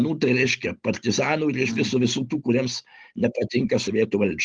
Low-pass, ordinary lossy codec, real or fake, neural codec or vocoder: 9.9 kHz; Opus, 24 kbps; real; none